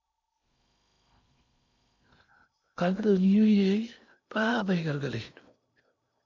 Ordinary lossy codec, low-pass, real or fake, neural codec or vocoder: MP3, 64 kbps; 7.2 kHz; fake; codec, 16 kHz in and 24 kHz out, 0.8 kbps, FocalCodec, streaming, 65536 codes